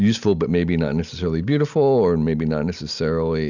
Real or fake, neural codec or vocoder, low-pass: real; none; 7.2 kHz